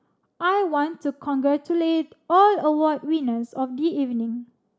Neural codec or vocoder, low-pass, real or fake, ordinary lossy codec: none; none; real; none